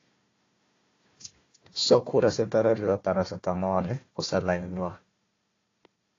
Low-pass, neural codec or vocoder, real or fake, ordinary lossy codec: 7.2 kHz; codec, 16 kHz, 1 kbps, FunCodec, trained on Chinese and English, 50 frames a second; fake; AAC, 32 kbps